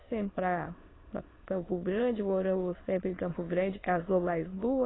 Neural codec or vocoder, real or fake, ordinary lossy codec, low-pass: autoencoder, 22.05 kHz, a latent of 192 numbers a frame, VITS, trained on many speakers; fake; AAC, 16 kbps; 7.2 kHz